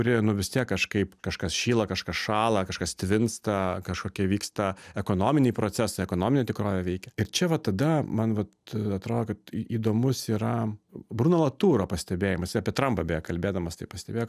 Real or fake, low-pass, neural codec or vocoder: real; 14.4 kHz; none